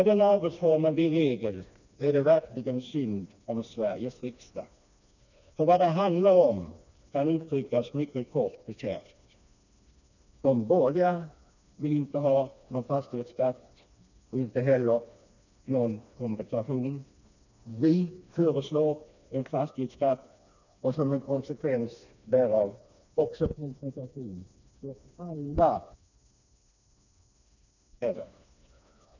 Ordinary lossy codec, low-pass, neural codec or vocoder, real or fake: none; 7.2 kHz; codec, 16 kHz, 2 kbps, FreqCodec, smaller model; fake